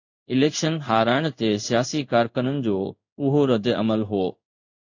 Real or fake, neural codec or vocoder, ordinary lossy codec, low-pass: fake; codec, 16 kHz in and 24 kHz out, 1 kbps, XY-Tokenizer; AAC, 48 kbps; 7.2 kHz